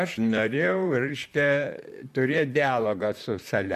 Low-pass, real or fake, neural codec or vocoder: 14.4 kHz; fake; vocoder, 44.1 kHz, 128 mel bands, Pupu-Vocoder